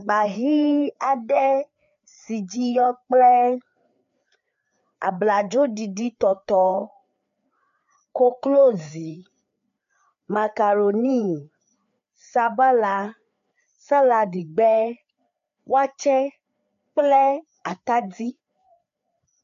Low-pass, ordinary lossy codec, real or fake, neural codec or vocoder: 7.2 kHz; MP3, 64 kbps; fake; codec, 16 kHz, 4 kbps, FreqCodec, larger model